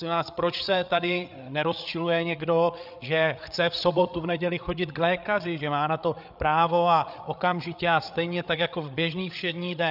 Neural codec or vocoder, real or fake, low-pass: codec, 16 kHz, 8 kbps, FreqCodec, larger model; fake; 5.4 kHz